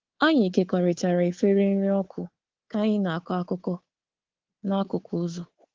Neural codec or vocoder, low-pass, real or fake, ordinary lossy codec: codec, 24 kHz, 3.1 kbps, DualCodec; 7.2 kHz; fake; Opus, 16 kbps